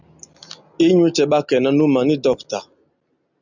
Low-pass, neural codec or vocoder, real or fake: 7.2 kHz; none; real